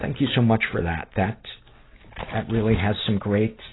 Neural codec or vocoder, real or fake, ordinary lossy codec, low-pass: none; real; AAC, 16 kbps; 7.2 kHz